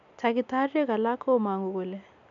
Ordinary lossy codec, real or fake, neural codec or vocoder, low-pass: none; real; none; 7.2 kHz